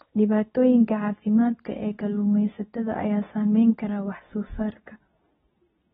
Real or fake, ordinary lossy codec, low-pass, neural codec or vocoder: fake; AAC, 16 kbps; 10.8 kHz; codec, 24 kHz, 0.9 kbps, WavTokenizer, medium speech release version 2